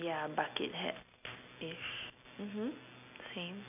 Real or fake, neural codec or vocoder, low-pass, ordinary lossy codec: real; none; 3.6 kHz; none